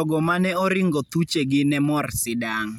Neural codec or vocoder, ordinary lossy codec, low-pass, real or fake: none; none; 19.8 kHz; real